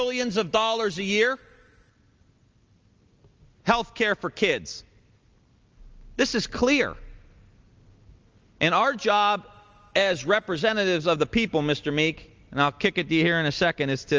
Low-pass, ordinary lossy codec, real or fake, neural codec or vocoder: 7.2 kHz; Opus, 24 kbps; real; none